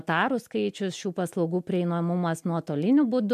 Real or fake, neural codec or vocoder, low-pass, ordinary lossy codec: real; none; 14.4 kHz; MP3, 96 kbps